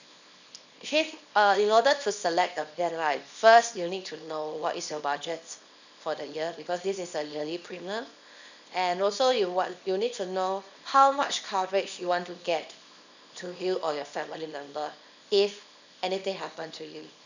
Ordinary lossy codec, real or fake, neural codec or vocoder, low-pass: none; fake; codec, 24 kHz, 0.9 kbps, WavTokenizer, small release; 7.2 kHz